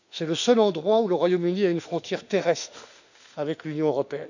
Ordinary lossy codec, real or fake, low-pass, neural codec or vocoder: none; fake; 7.2 kHz; autoencoder, 48 kHz, 32 numbers a frame, DAC-VAE, trained on Japanese speech